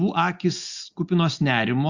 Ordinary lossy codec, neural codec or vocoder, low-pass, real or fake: Opus, 64 kbps; none; 7.2 kHz; real